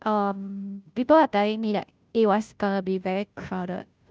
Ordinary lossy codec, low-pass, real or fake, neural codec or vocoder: none; none; fake; codec, 16 kHz, 0.5 kbps, FunCodec, trained on Chinese and English, 25 frames a second